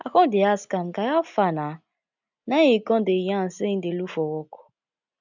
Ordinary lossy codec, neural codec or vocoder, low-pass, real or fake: none; none; 7.2 kHz; real